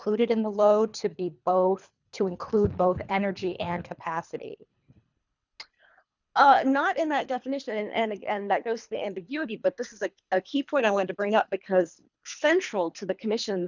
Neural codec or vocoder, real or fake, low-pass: codec, 24 kHz, 3 kbps, HILCodec; fake; 7.2 kHz